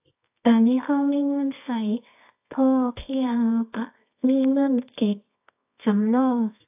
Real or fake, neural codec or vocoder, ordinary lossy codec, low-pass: fake; codec, 24 kHz, 0.9 kbps, WavTokenizer, medium music audio release; AAC, 32 kbps; 3.6 kHz